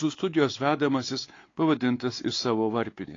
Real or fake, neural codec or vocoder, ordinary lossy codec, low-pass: fake; codec, 16 kHz, 4 kbps, X-Codec, WavLM features, trained on Multilingual LibriSpeech; AAC, 32 kbps; 7.2 kHz